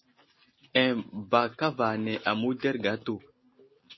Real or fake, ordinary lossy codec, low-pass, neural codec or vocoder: real; MP3, 24 kbps; 7.2 kHz; none